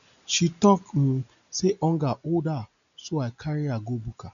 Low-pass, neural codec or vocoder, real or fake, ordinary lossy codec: 7.2 kHz; none; real; none